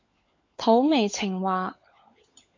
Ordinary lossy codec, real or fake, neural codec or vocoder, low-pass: MP3, 48 kbps; fake; codec, 16 kHz, 16 kbps, FunCodec, trained on LibriTTS, 50 frames a second; 7.2 kHz